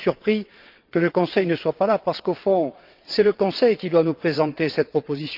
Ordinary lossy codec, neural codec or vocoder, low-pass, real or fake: Opus, 24 kbps; vocoder, 22.05 kHz, 80 mel bands, WaveNeXt; 5.4 kHz; fake